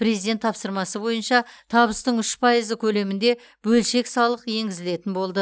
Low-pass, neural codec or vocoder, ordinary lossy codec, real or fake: none; none; none; real